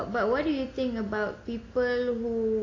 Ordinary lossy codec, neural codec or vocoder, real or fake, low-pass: AAC, 32 kbps; none; real; 7.2 kHz